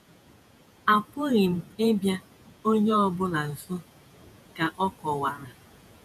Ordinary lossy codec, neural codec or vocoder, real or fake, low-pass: none; vocoder, 48 kHz, 128 mel bands, Vocos; fake; 14.4 kHz